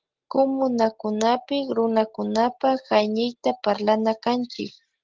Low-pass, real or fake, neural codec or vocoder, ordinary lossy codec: 7.2 kHz; real; none; Opus, 16 kbps